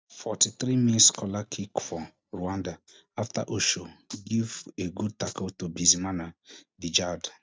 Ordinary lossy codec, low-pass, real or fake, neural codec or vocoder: none; none; real; none